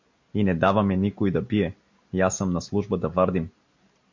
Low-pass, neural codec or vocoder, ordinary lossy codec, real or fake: 7.2 kHz; none; MP3, 48 kbps; real